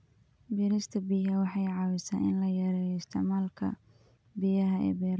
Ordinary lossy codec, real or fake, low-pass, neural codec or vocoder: none; real; none; none